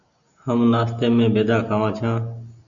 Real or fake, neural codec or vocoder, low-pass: real; none; 7.2 kHz